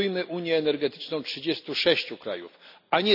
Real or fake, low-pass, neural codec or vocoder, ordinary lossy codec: real; 5.4 kHz; none; none